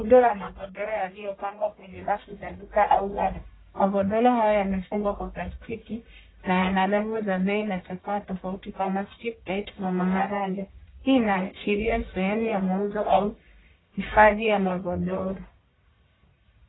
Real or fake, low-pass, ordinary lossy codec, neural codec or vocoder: fake; 7.2 kHz; AAC, 16 kbps; codec, 44.1 kHz, 1.7 kbps, Pupu-Codec